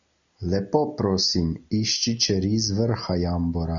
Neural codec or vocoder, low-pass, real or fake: none; 7.2 kHz; real